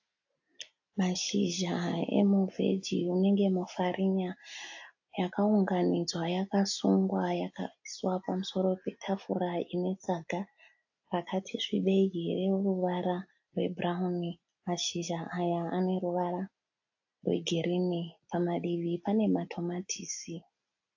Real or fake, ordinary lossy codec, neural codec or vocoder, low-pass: real; AAC, 48 kbps; none; 7.2 kHz